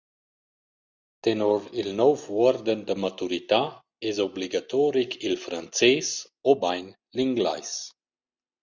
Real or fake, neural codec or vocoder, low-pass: real; none; 7.2 kHz